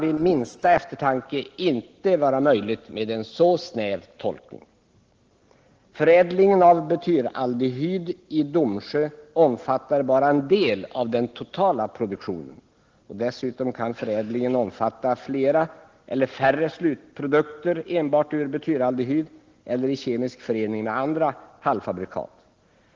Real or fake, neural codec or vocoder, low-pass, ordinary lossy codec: real; none; 7.2 kHz; Opus, 16 kbps